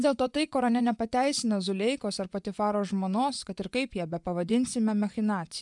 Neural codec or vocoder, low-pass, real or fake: vocoder, 24 kHz, 100 mel bands, Vocos; 10.8 kHz; fake